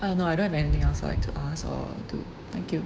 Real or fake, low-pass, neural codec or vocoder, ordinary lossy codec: fake; none; codec, 16 kHz, 6 kbps, DAC; none